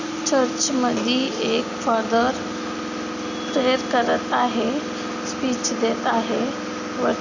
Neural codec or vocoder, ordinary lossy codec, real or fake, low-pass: none; none; real; 7.2 kHz